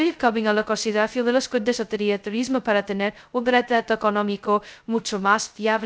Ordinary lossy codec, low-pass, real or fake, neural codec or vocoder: none; none; fake; codec, 16 kHz, 0.2 kbps, FocalCodec